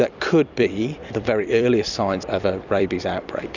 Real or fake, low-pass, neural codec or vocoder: fake; 7.2 kHz; vocoder, 44.1 kHz, 128 mel bands every 256 samples, BigVGAN v2